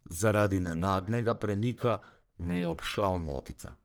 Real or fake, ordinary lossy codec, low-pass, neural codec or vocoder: fake; none; none; codec, 44.1 kHz, 1.7 kbps, Pupu-Codec